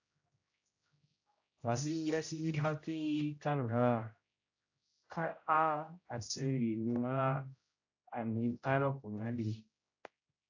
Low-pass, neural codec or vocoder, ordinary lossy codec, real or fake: 7.2 kHz; codec, 16 kHz, 0.5 kbps, X-Codec, HuBERT features, trained on general audio; AAC, 48 kbps; fake